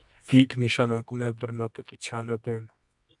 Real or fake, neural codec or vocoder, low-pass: fake; codec, 24 kHz, 0.9 kbps, WavTokenizer, medium music audio release; 10.8 kHz